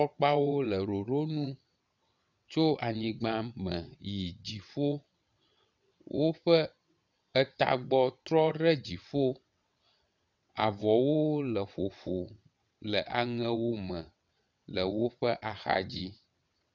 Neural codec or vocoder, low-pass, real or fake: vocoder, 22.05 kHz, 80 mel bands, WaveNeXt; 7.2 kHz; fake